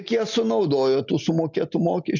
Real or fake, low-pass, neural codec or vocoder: real; 7.2 kHz; none